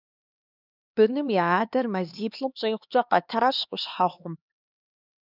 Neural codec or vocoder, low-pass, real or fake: codec, 16 kHz, 2 kbps, X-Codec, HuBERT features, trained on LibriSpeech; 5.4 kHz; fake